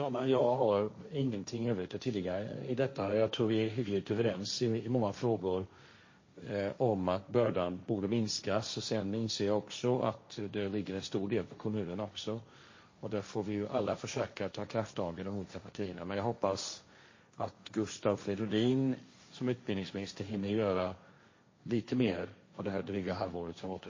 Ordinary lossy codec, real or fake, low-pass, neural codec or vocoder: MP3, 32 kbps; fake; 7.2 kHz; codec, 16 kHz, 1.1 kbps, Voila-Tokenizer